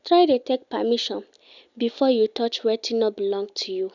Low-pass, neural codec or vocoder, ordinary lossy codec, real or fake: 7.2 kHz; none; none; real